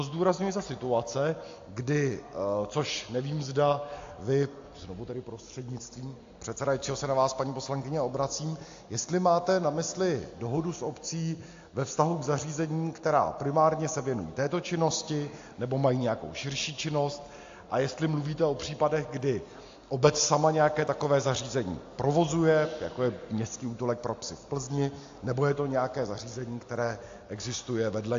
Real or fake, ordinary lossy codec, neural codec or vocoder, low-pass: real; AAC, 48 kbps; none; 7.2 kHz